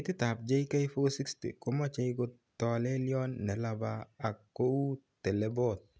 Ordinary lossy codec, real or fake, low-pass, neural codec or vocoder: none; real; none; none